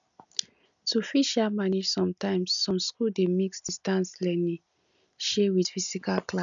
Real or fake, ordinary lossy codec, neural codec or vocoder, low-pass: real; MP3, 96 kbps; none; 7.2 kHz